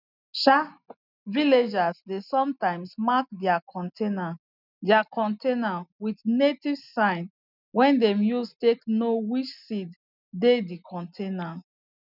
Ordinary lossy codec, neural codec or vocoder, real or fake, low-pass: none; none; real; 5.4 kHz